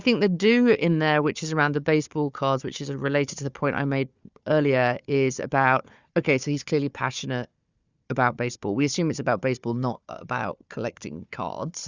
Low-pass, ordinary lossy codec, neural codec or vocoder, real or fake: 7.2 kHz; Opus, 64 kbps; codec, 16 kHz, 4 kbps, FunCodec, trained on Chinese and English, 50 frames a second; fake